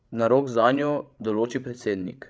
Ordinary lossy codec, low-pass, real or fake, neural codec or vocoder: none; none; fake; codec, 16 kHz, 16 kbps, FreqCodec, larger model